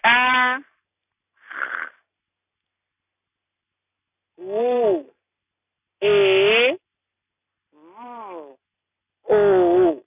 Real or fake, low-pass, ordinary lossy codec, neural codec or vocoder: real; 3.6 kHz; none; none